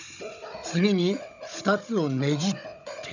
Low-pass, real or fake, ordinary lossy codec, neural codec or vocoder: 7.2 kHz; fake; none; codec, 16 kHz, 16 kbps, FunCodec, trained on Chinese and English, 50 frames a second